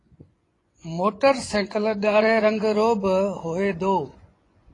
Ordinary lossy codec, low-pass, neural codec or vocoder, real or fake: AAC, 32 kbps; 10.8 kHz; vocoder, 24 kHz, 100 mel bands, Vocos; fake